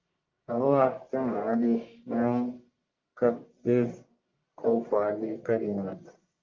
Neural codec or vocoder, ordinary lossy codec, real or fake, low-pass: codec, 44.1 kHz, 1.7 kbps, Pupu-Codec; Opus, 24 kbps; fake; 7.2 kHz